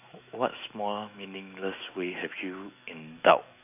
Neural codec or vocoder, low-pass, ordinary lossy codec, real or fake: autoencoder, 48 kHz, 128 numbers a frame, DAC-VAE, trained on Japanese speech; 3.6 kHz; none; fake